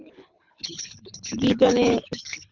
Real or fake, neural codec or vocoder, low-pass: fake; codec, 24 kHz, 6 kbps, HILCodec; 7.2 kHz